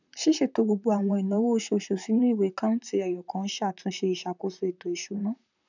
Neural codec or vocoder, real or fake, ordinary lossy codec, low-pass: vocoder, 44.1 kHz, 128 mel bands, Pupu-Vocoder; fake; none; 7.2 kHz